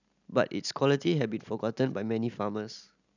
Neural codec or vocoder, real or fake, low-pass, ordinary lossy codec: none; real; 7.2 kHz; none